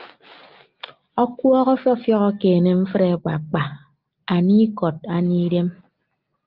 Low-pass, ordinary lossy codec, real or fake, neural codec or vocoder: 5.4 kHz; Opus, 32 kbps; real; none